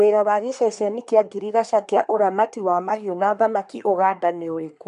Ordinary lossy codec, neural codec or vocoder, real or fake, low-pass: none; codec, 24 kHz, 1 kbps, SNAC; fake; 10.8 kHz